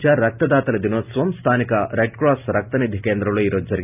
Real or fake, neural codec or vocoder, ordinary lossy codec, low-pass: real; none; none; 3.6 kHz